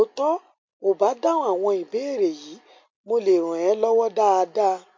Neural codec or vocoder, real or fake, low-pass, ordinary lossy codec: none; real; 7.2 kHz; MP3, 48 kbps